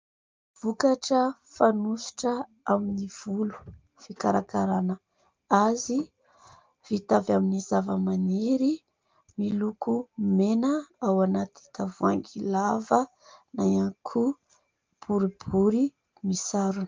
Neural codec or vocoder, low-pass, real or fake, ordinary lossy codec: none; 7.2 kHz; real; Opus, 24 kbps